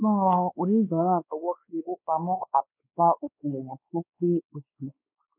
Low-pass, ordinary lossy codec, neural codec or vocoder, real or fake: 3.6 kHz; none; codec, 16 kHz, 2 kbps, X-Codec, WavLM features, trained on Multilingual LibriSpeech; fake